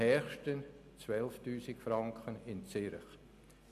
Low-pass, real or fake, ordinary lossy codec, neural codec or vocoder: 14.4 kHz; real; none; none